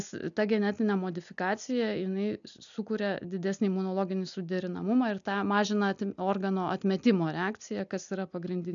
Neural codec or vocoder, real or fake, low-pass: none; real; 7.2 kHz